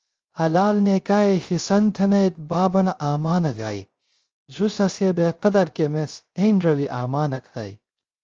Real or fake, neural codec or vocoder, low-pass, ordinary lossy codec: fake; codec, 16 kHz, 0.3 kbps, FocalCodec; 7.2 kHz; Opus, 32 kbps